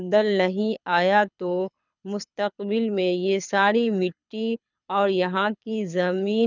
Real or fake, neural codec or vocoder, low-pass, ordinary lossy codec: fake; codec, 24 kHz, 6 kbps, HILCodec; 7.2 kHz; none